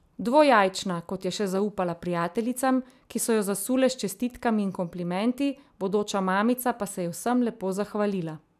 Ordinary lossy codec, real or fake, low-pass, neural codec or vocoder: none; real; 14.4 kHz; none